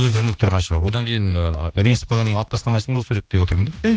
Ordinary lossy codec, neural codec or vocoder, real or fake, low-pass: none; codec, 16 kHz, 1 kbps, X-Codec, HuBERT features, trained on general audio; fake; none